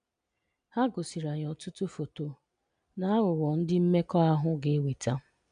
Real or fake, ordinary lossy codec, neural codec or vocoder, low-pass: real; none; none; 9.9 kHz